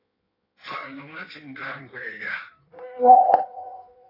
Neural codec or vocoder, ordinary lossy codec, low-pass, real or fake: codec, 16 kHz in and 24 kHz out, 1.1 kbps, FireRedTTS-2 codec; AAC, 24 kbps; 5.4 kHz; fake